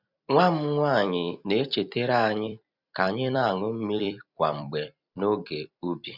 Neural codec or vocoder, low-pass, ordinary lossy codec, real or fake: vocoder, 44.1 kHz, 128 mel bands every 256 samples, BigVGAN v2; 5.4 kHz; none; fake